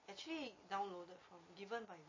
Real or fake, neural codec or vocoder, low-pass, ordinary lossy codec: real; none; 7.2 kHz; MP3, 32 kbps